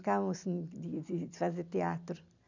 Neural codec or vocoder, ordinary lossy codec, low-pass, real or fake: none; none; 7.2 kHz; real